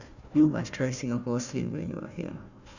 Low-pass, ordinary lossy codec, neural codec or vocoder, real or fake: 7.2 kHz; none; codec, 16 kHz, 1 kbps, FunCodec, trained on Chinese and English, 50 frames a second; fake